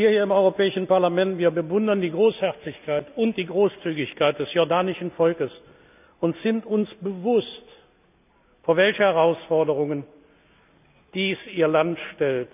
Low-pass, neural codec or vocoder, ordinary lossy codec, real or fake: 3.6 kHz; none; none; real